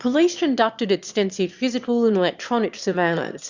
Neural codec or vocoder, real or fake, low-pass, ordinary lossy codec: autoencoder, 22.05 kHz, a latent of 192 numbers a frame, VITS, trained on one speaker; fake; 7.2 kHz; Opus, 64 kbps